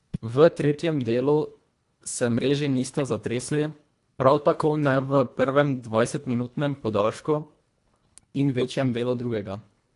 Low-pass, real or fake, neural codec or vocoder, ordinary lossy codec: 10.8 kHz; fake; codec, 24 kHz, 1.5 kbps, HILCodec; AAC, 64 kbps